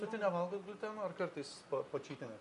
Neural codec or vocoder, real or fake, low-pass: none; real; 10.8 kHz